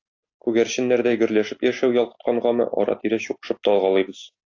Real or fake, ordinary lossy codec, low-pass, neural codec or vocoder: real; AAC, 48 kbps; 7.2 kHz; none